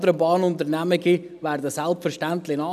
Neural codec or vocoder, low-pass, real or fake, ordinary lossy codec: none; 14.4 kHz; real; none